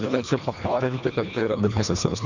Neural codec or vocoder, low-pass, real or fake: codec, 24 kHz, 1.5 kbps, HILCodec; 7.2 kHz; fake